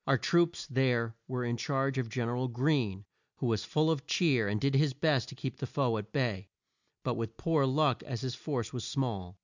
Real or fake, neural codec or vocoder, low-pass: real; none; 7.2 kHz